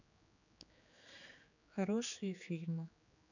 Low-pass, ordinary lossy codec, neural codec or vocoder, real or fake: 7.2 kHz; none; codec, 16 kHz, 4 kbps, X-Codec, HuBERT features, trained on general audio; fake